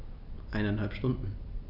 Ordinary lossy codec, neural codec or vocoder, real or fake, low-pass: none; none; real; 5.4 kHz